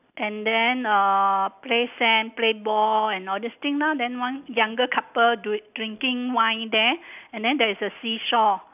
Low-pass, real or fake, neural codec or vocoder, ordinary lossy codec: 3.6 kHz; real; none; none